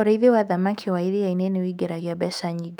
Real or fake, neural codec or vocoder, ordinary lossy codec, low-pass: fake; autoencoder, 48 kHz, 128 numbers a frame, DAC-VAE, trained on Japanese speech; none; 19.8 kHz